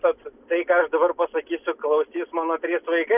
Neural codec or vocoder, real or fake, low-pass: none; real; 3.6 kHz